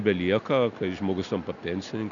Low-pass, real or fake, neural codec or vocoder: 7.2 kHz; real; none